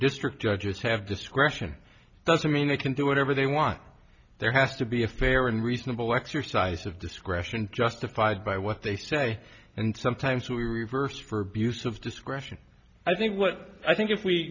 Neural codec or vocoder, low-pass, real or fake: none; 7.2 kHz; real